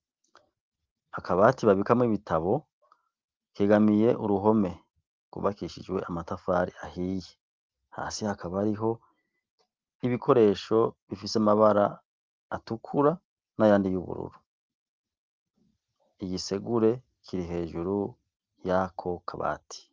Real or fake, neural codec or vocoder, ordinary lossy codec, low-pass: real; none; Opus, 32 kbps; 7.2 kHz